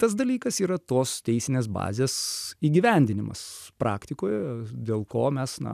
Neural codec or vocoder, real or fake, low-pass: none; real; 14.4 kHz